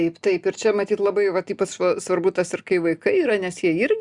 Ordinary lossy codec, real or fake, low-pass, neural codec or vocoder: Opus, 64 kbps; real; 10.8 kHz; none